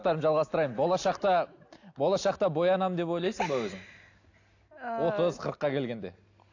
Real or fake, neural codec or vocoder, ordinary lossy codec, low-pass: real; none; AAC, 48 kbps; 7.2 kHz